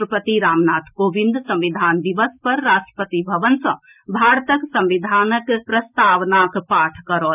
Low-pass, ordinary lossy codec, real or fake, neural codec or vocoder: 3.6 kHz; none; real; none